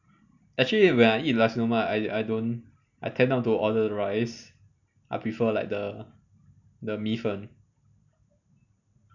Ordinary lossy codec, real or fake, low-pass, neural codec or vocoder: none; real; 7.2 kHz; none